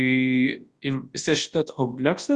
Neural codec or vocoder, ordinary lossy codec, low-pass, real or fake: codec, 24 kHz, 0.9 kbps, WavTokenizer, large speech release; Opus, 32 kbps; 10.8 kHz; fake